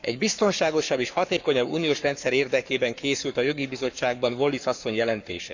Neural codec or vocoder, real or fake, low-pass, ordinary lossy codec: codec, 44.1 kHz, 7.8 kbps, Pupu-Codec; fake; 7.2 kHz; none